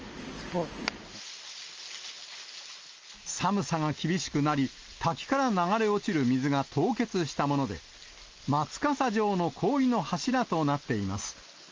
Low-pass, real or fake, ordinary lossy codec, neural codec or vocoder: 7.2 kHz; real; Opus, 24 kbps; none